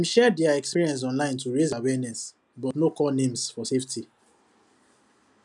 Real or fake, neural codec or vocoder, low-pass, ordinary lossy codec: real; none; 10.8 kHz; none